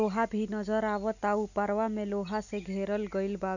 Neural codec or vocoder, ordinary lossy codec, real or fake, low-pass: none; none; real; 7.2 kHz